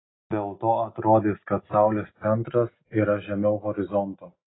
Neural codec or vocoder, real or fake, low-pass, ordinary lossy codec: none; real; 7.2 kHz; AAC, 16 kbps